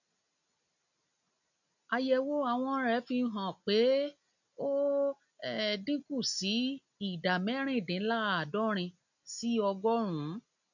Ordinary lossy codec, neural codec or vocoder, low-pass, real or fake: none; none; 7.2 kHz; real